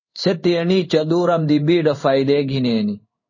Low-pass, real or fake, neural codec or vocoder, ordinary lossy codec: 7.2 kHz; fake; codec, 16 kHz in and 24 kHz out, 1 kbps, XY-Tokenizer; MP3, 32 kbps